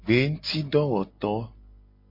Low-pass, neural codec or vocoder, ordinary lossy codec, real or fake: 5.4 kHz; none; MP3, 32 kbps; real